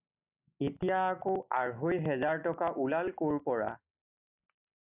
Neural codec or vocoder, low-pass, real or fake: none; 3.6 kHz; real